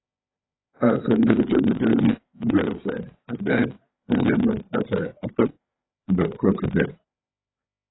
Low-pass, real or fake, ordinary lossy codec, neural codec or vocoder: 7.2 kHz; fake; AAC, 16 kbps; codec, 16 kHz, 4 kbps, FreqCodec, larger model